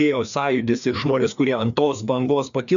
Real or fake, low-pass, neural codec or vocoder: fake; 7.2 kHz; codec, 16 kHz, 2 kbps, FreqCodec, larger model